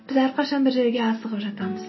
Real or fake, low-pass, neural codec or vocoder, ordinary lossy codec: real; 7.2 kHz; none; MP3, 24 kbps